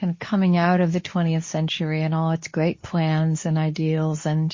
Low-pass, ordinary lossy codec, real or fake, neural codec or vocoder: 7.2 kHz; MP3, 32 kbps; fake; codec, 24 kHz, 0.9 kbps, WavTokenizer, medium speech release version 2